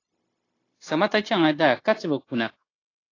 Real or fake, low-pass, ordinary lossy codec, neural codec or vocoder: fake; 7.2 kHz; AAC, 32 kbps; codec, 16 kHz, 0.9 kbps, LongCat-Audio-Codec